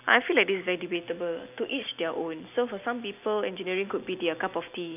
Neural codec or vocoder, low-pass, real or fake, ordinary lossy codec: none; 3.6 kHz; real; none